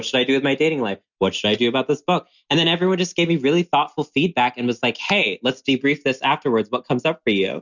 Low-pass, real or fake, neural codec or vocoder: 7.2 kHz; real; none